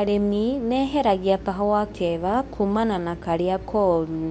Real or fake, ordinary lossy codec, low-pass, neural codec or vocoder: fake; MP3, 96 kbps; 10.8 kHz; codec, 24 kHz, 0.9 kbps, WavTokenizer, medium speech release version 2